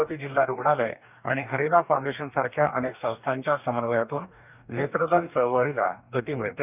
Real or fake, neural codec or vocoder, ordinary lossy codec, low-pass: fake; codec, 44.1 kHz, 2.6 kbps, DAC; none; 3.6 kHz